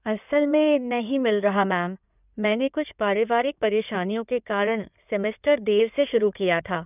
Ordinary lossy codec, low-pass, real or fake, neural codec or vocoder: none; 3.6 kHz; fake; codec, 16 kHz in and 24 kHz out, 2.2 kbps, FireRedTTS-2 codec